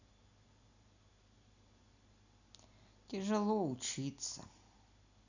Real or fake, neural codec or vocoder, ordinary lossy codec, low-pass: real; none; none; 7.2 kHz